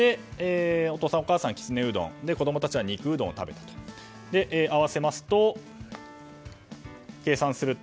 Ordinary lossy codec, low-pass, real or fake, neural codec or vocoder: none; none; real; none